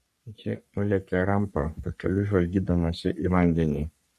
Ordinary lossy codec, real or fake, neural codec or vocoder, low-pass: AAC, 96 kbps; fake; codec, 44.1 kHz, 3.4 kbps, Pupu-Codec; 14.4 kHz